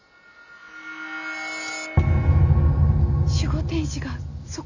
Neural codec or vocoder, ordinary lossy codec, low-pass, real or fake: none; none; 7.2 kHz; real